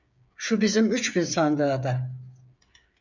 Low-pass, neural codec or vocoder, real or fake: 7.2 kHz; codec, 16 kHz, 8 kbps, FreqCodec, smaller model; fake